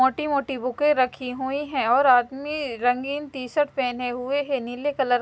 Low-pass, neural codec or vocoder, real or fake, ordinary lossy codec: none; none; real; none